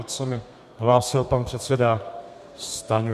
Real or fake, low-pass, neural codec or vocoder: fake; 14.4 kHz; codec, 32 kHz, 1.9 kbps, SNAC